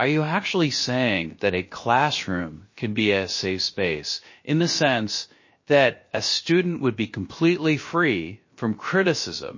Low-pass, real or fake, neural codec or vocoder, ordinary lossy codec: 7.2 kHz; fake; codec, 16 kHz, about 1 kbps, DyCAST, with the encoder's durations; MP3, 32 kbps